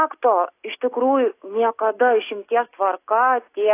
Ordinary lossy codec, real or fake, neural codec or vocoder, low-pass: AAC, 24 kbps; real; none; 3.6 kHz